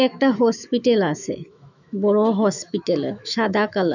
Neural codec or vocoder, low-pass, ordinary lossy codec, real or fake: autoencoder, 48 kHz, 128 numbers a frame, DAC-VAE, trained on Japanese speech; 7.2 kHz; none; fake